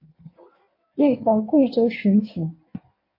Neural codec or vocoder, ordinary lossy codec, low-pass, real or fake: codec, 16 kHz in and 24 kHz out, 1.1 kbps, FireRedTTS-2 codec; MP3, 32 kbps; 5.4 kHz; fake